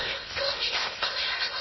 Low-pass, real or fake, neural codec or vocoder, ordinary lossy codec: 7.2 kHz; fake; codec, 16 kHz in and 24 kHz out, 0.8 kbps, FocalCodec, streaming, 65536 codes; MP3, 24 kbps